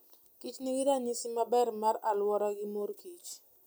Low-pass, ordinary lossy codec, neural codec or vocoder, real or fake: none; none; none; real